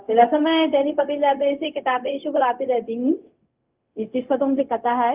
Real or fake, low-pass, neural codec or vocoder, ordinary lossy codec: fake; 3.6 kHz; codec, 16 kHz, 0.4 kbps, LongCat-Audio-Codec; Opus, 32 kbps